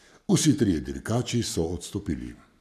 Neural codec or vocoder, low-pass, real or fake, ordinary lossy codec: codec, 44.1 kHz, 7.8 kbps, Pupu-Codec; 14.4 kHz; fake; none